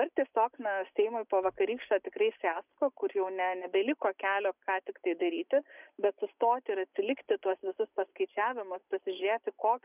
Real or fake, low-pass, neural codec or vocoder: real; 3.6 kHz; none